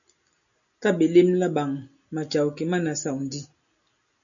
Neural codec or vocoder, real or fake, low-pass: none; real; 7.2 kHz